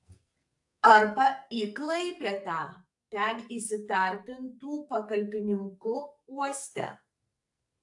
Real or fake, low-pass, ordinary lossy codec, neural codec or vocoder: fake; 10.8 kHz; AAC, 64 kbps; codec, 44.1 kHz, 2.6 kbps, SNAC